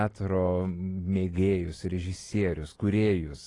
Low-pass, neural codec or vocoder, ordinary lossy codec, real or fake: 10.8 kHz; none; AAC, 32 kbps; real